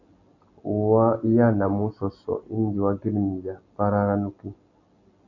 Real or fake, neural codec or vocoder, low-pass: real; none; 7.2 kHz